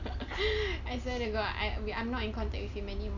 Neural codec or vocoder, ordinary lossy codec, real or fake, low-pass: none; none; real; 7.2 kHz